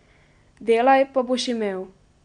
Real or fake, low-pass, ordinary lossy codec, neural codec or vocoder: real; 9.9 kHz; none; none